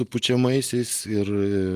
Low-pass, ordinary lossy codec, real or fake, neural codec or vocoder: 14.4 kHz; Opus, 32 kbps; real; none